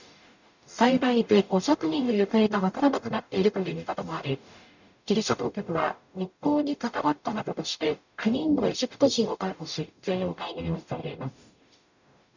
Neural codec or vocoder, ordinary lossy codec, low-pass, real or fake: codec, 44.1 kHz, 0.9 kbps, DAC; none; 7.2 kHz; fake